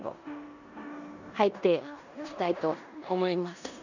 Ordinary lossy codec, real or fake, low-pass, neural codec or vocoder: none; fake; 7.2 kHz; codec, 16 kHz in and 24 kHz out, 0.9 kbps, LongCat-Audio-Codec, four codebook decoder